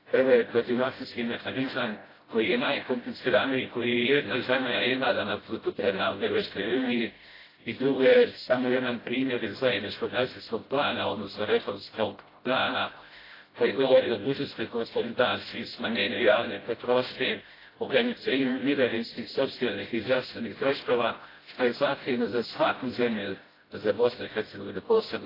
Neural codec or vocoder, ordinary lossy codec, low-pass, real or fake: codec, 16 kHz, 0.5 kbps, FreqCodec, smaller model; AAC, 24 kbps; 5.4 kHz; fake